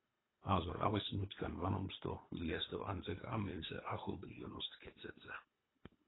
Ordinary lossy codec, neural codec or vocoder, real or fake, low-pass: AAC, 16 kbps; codec, 24 kHz, 3 kbps, HILCodec; fake; 7.2 kHz